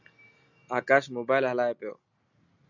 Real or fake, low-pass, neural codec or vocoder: real; 7.2 kHz; none